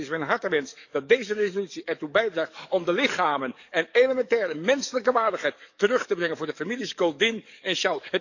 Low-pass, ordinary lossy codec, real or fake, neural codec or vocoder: 7.2 kHz; none; fake; codec, 44.1 kHz, 7.8 kbps, DAC